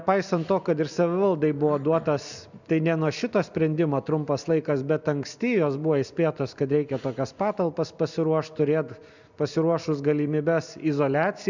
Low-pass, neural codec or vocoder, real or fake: 7.2 kHz; none; real